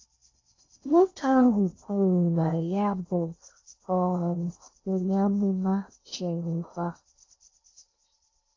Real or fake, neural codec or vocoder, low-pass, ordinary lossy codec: fake; codec, 16 kHz in and 24 kHz out, 0.6 kbps, FocalCodec, streaming, 4096 codes; 7.2 kHz; AAC, 32 kbps